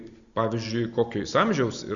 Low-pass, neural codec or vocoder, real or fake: 7.2 kHz; none; real